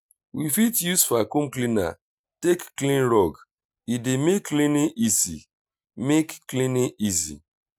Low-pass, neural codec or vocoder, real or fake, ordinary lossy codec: none; none; real; none